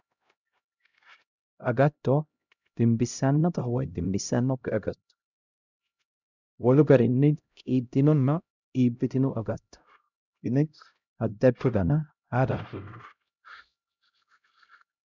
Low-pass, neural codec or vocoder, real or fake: 7.2 kHz; codec, 16 kHz, 0.5 kbps, X-Codec, HuBERT features, trained on LibriSpeech; fake